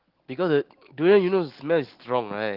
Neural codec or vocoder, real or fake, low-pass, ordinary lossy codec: none; real; 5.4 kHz; Opus, 16 kbps